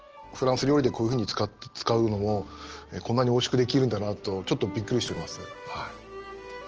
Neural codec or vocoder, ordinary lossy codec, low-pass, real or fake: none; Opus, 16 kbps; 7.2 kHz; real